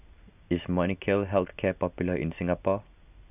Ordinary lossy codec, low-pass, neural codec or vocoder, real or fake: none; 3.6 kHz; none; real